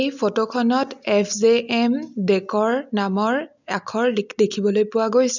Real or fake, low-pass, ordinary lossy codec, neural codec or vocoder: real; 7.2 kHz; none; none